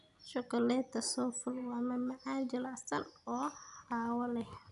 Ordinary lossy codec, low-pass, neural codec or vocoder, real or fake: none; 10.8 kHz; none; real